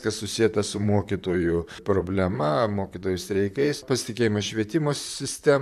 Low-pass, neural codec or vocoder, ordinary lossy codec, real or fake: 14.4 kHz; vocoder, 44.1 kHz, 128 mel bands, Pupu-Vocoder; AAC, 96 kbps; fake